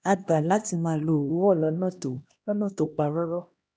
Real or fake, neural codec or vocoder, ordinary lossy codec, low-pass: fake; codec, 16 kHz, 1 kbps, X-Codec, HuBERT features, trained on LibriSpeech; none; none